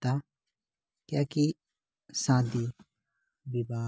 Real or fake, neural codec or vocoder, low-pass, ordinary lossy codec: real; none; none; none